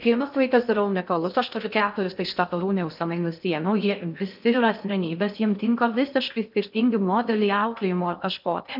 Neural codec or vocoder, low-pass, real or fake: codec, 16 kHz in and 24 kHz out, 0.6 kbps, FocalCodec, streaming, 2048 codes; 5.4 kHz; fake